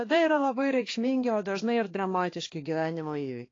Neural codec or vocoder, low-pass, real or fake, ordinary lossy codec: codec, 16 kHz, 2 kbps, X-Codec, HuBERT features, trained on balanced general audio; 7.2 kHz; fake; AAC, 32 kbps